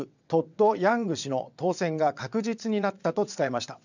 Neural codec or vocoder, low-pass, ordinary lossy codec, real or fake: vocoder, 22.05 kHz, 80 mel bands, WaveNeXt; 7.2 kHz; none; fake